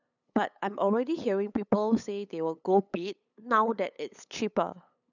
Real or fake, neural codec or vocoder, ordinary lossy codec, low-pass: fake; codec, 16 kHz, 8 kbps, FunCodec, trained on LibriTTS, 25 frames a second; none; 7.2 kHz